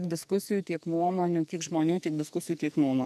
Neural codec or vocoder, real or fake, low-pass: codec, 44.1 kHz, 2.6 kbps, SNAC; fake; 14.4 kHz